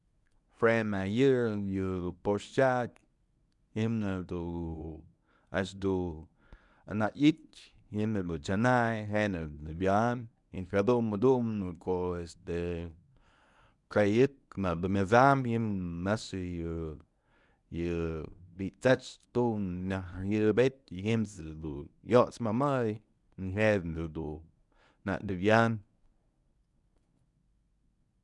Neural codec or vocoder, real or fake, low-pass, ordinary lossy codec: codec, 24 kHz, 0.9 kbps, WavTokenizer, medium speech release version 1; fake; 10.8 kHz; none